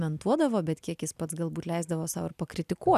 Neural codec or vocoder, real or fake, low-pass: none; real; 14.4 kHz